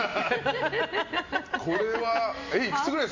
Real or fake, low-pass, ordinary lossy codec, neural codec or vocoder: real; 7.2 kHz; MP3, 48 kbps; none